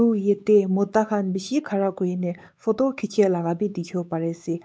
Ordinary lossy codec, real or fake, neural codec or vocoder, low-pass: none; fake; codec, 16 kHz, 4 kbps, X-Codec, WavLM features, trained on Multilingual LibriSpeech; none